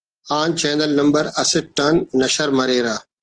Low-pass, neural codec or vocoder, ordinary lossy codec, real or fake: 9.9 kHz; none; Opus, 16 kbps; real